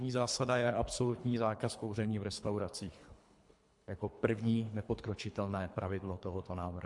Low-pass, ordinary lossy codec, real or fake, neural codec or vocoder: 10.8 kHz; MP3, 64 kbps; fake; codec, 24 kHz, 3 kbps, HILCodec